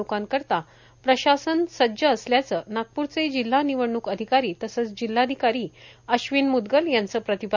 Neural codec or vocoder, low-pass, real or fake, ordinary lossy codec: none; 7.2 kHz; real; none